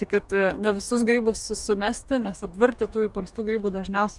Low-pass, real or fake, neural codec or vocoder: 10.8 kHz; fake; codec, 44.1 kHz, 2.6 kbps, DAC